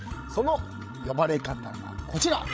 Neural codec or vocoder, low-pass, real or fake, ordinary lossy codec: codec, 16 kHz, 16 kbps, FreqCodec, larger model; none; fake; none